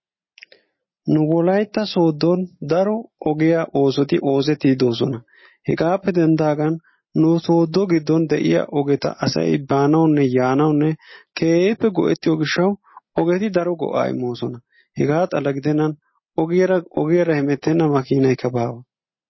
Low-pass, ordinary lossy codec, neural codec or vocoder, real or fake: 7.2 kHz; MP3, 24 kbps; none; real